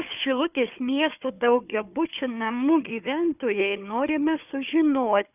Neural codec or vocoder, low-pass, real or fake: codec, 16 kHz, 4 kbps, FunCodec, trained on Chinese and English, 50 frames a second; 3.6 kHz; fake